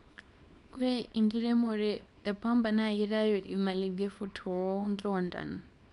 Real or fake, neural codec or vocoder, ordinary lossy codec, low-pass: fake; codec, 24 kHz, 0.9 kbps, WavTokenizer, small release; none; 10.8 kHz